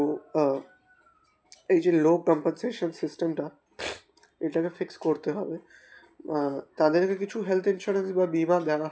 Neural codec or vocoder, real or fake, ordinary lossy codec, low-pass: none; real; none; none